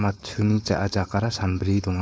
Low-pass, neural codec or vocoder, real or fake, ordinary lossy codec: none; codec, 16 kHz, 4.8 kbps, FACodec; fake; none